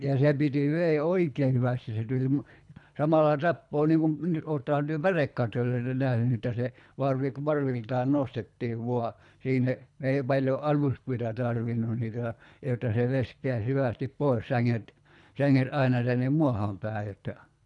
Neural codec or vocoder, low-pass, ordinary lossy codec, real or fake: codec, 24 kHz, 3 kbps, HILCodec; 10.8 kHz; none; fake